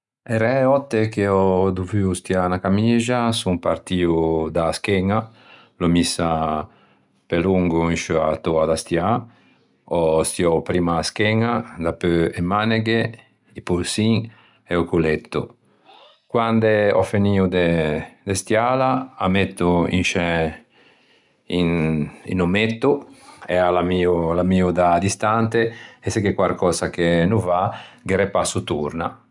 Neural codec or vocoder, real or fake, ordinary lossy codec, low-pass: none; real; none; 10.8 kHz